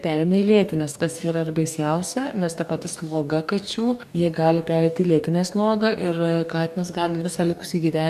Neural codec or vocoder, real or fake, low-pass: codec, 44.1 kHz, 2.6 kbps, DAC; fake; 14.4 kHz